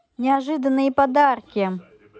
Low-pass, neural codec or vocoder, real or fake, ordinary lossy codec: none; none; real; none